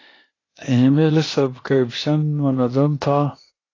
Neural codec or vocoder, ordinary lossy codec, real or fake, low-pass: codec, 16 kHz, 0.8 kbps, ZipCodec; AAC, 32 kbps; fake; 7.2 kHz